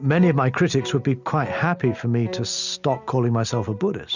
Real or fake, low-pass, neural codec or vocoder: real; 7.2 kHz; none